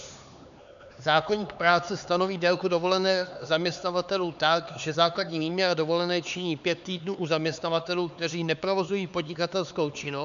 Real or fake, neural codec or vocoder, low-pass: fake; codec, 16 kHz, 4 kbps, X-Codec, HuBERT features, trained on LibriSpeech; 7.2 kHz